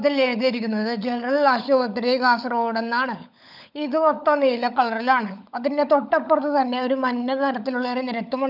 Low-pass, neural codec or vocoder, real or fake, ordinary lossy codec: 5.4 kHz; codec, 16 kHz, 16 kbps, FunCodec, trained on LibriTTS, 50 frames a second; fake; none